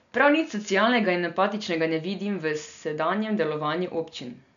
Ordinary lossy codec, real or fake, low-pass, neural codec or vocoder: none; real; 7.2 kHz; none